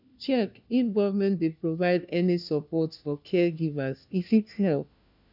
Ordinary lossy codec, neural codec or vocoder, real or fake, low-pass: none; codec, 16 kHz, 1 kbps, FunCodec, trained on LibriTTS, 50 frames a second; fake; 5.4 kHz